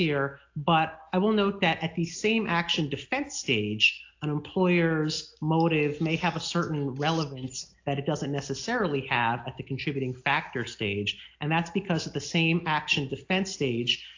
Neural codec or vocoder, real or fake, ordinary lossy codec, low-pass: none; real; AAC, 48 kbps; 7.2 kHz